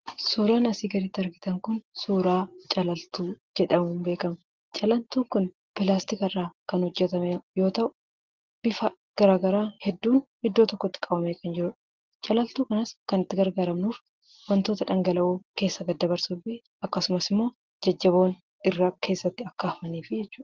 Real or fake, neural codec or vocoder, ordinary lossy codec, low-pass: real; none; Opus, 16 kbps; 7.2 kHz